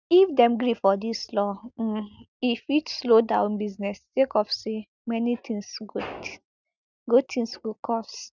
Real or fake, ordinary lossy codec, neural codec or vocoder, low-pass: real; none; none; 7.2 kHz